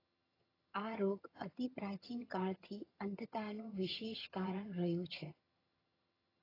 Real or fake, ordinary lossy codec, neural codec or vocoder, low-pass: fake; AAC, 24 kbps; vocoder, 22.05 kHz, 80 mel bands, HiFi-GAN; 5.4 kHz